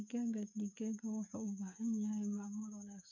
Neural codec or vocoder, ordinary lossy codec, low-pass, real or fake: codec, 16 kHz, 8 kbps, FreqCodec, smaller model; none; 7.2 kHz; fake